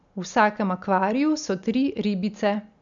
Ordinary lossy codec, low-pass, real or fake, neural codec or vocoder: none; 7.2 kHz; real; none